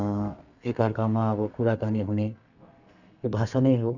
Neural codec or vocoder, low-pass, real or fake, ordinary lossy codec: codec, 32 kHz, 1.9 kbps, SNAC; 7.2 kHz; fake; none